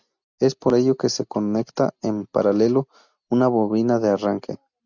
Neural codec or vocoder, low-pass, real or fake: none; 7.2 kHz; real